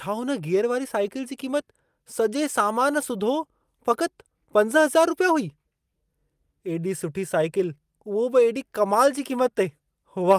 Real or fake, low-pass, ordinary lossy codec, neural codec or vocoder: real; 14.4 kHz; Opus, 32 kbps; none